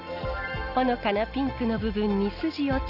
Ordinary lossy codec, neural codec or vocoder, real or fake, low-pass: none; none; real; 5.4 kHz